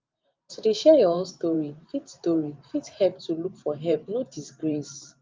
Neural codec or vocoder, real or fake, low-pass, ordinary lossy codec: vocoder, 44.1 kHz, 128 mel bands every 512 samples, BigVGAN v2; fake; 7.2 kHz; Opus, 24 kbps